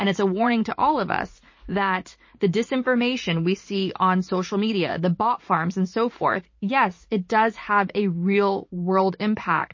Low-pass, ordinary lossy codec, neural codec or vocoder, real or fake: 7.2 kHz; MP3, 32 kbps; vocoder, 44.1 kHz, 80 mel bands, Vocos; fake